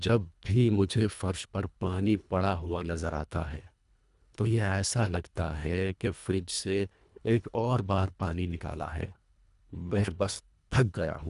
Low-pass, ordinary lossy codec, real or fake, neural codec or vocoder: 10.8 kHz; none; fake; codec, 24 kHz, 1.5 kbps, HILCodec